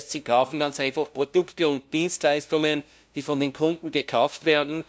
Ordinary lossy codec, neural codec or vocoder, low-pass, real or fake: none; codec, 16 kHz, 0.5 kbps, FunCodec, trained on LibriTTS, 25 frames a second; none; fake